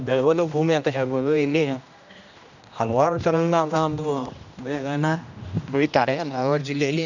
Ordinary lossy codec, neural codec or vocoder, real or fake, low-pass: none; codec, 16 kHz, 1 kbps, X-Codec, HuBERT features, trained on general audio; fake; 7.2 kHz